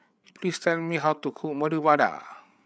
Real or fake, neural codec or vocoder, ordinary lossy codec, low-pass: fake; codec, 16 kHz, 8 kbps, FreqCodec, larger model; none; none